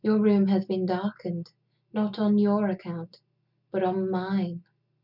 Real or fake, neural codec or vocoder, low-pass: real; none; 5.4 kHz